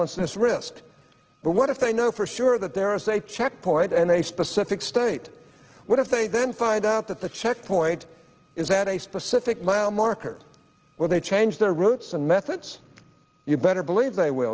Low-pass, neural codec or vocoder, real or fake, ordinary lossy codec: 7.2 kHz; none; real; Opus, 16 kbps